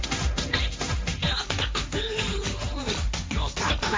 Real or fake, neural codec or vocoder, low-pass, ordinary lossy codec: fake; codec, 16 kHz, 1.1 kbps, Voila-Tokenizer; none; none